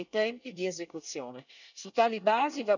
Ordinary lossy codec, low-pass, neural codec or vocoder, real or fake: none; 7.2 kHz; codec, 24 kHz, 1 kbps, SNAC; fake